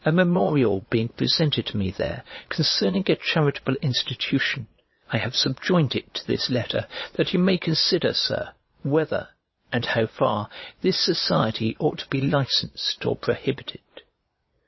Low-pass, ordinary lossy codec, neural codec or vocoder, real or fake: 7.2 kHz; MP3, 24 kbps; vocoder, 22.05 kHz, 80 mel bands, Vocos; fake